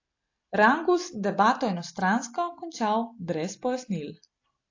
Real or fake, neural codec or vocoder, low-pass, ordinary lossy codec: real; none; 7.2 kHz; AAC, 48 kbps